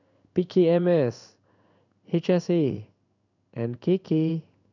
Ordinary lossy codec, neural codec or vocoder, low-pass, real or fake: none; codec, 16 kHz in and 24 kHz out, 1 kbps, XY-Tokenizer; 7.2 kHz; fake